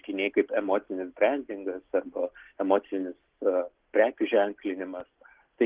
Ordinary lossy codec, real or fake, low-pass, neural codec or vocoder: Opus, 16 kbps; real; 3.6 kHz; none